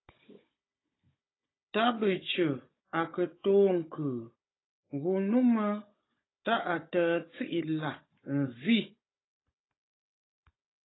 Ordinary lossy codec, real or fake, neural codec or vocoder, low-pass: AAC, 16 kbps; fake; codec, 16 kHz, 16 kbps, FunCodec, trained on Chinese and English, 50 frames a second; 7.2 kHz